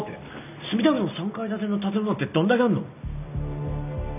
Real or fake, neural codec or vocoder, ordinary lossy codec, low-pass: real; none; none; 3.6 kHz